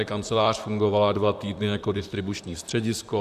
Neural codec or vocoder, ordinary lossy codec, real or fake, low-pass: vocoder, 44.1 kHz, 128 mel bands, Pupu-Vocoder; AAC, 96 kbps; fake; 14.4 kHz